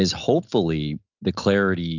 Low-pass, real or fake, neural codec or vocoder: 7.2 kHz; real; none